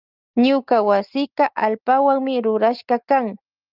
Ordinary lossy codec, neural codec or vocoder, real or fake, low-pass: Opus, 24 kbps; none; real; 5.4 kHz